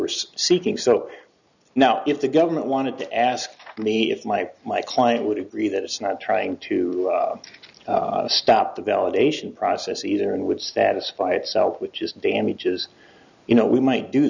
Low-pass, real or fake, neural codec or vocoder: 7.2 kHz; real; none